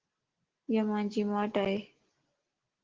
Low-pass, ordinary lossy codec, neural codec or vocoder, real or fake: 7.2 kHz; Opus, 16 kbps; none; real